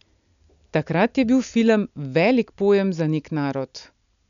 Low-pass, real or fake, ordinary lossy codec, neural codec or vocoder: 7.2 kHz; real; none; none